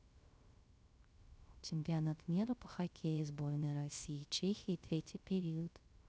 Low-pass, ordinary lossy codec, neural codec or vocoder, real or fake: none; none; codec, 16 kHz, 0.3 kbps, FocalCodec; fake